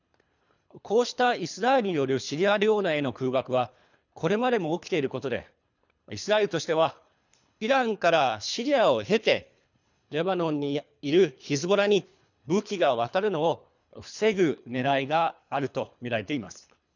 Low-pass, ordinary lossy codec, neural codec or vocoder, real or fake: 7.2 kHz; none; codec, 24 kHz, 3 kbps, HILCodec; fake